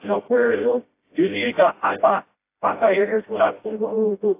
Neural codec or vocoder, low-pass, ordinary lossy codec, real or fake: codec, 16 kHz, 0.5 kbps, FreqCodec, smaller model; 3.6 kHz; AAC, 24 kbps; fake